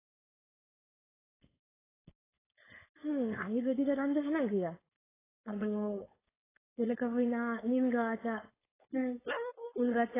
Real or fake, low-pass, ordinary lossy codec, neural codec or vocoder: fake; 3.6 kHz; AAC, 16 kbps; codec, 16 kHz, 4.8 kbps, FACodec